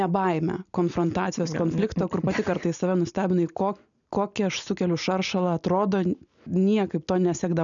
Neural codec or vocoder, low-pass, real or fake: none; 7.2 kHz; real